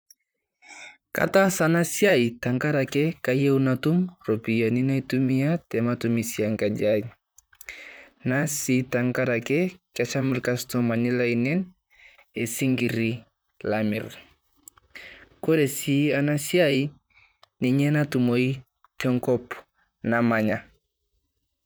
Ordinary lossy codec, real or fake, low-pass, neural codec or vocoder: none; fake; none; vocoder, 44.1 kHz, 128 mel bands, Pupu-Vocoder